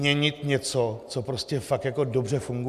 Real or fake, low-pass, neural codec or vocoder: real; 14.4 kHz; none